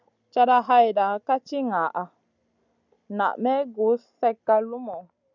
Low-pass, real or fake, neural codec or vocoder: 7.2 kHz; real; none